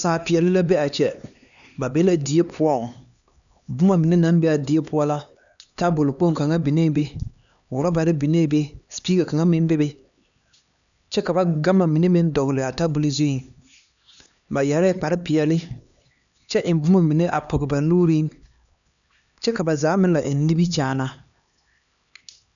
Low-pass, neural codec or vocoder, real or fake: 7.2 kHz; codec, 16 kHz, 2 kbps, X-Codec, HuBERT features, trained on LibriSpeech; fake